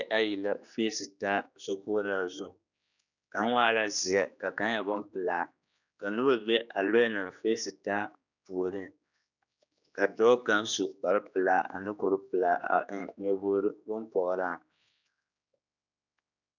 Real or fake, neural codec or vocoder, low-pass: fake; codec, 16 kHz, 2 kbps, X-Codec, HuBERT features, trained on general audio; 7.2 kHz